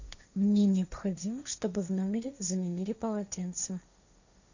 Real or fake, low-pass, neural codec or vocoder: fake; 7.2 kHz; codec, 16 kHz, 1.1 kbps, Voila-Tokenizer